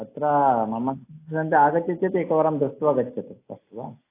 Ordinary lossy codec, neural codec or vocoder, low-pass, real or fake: AAC, 24 kbps; none; 3.6 kHz; real